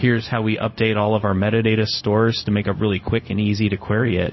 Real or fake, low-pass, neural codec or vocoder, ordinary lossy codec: fake; 7.2 kHz; codec, 16 kHz, 0.4 kbps, LongCat-Audio-Codec; MP3, 24 kbps